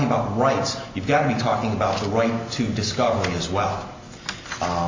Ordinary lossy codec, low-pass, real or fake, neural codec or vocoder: MP3, 64 kbps; 7.2 kHz; real; none